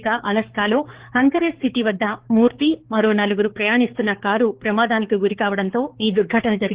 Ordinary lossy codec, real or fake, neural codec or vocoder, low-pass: Opus, 16 kbps; fake; codec, 16 kHz, 4 kbps, X-Codec, HuBERT features, trained on balanced general audio; 3.6 kHz